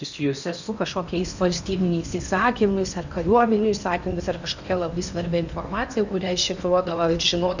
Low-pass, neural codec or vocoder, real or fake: 7.2 kHz; codec, 16 kHz in and 24 kHz out, 0.8 kbps, FocalCodec, streaming, 65536 codes; fake